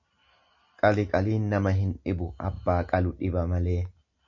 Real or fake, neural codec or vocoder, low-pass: real; none; 7.2 kHz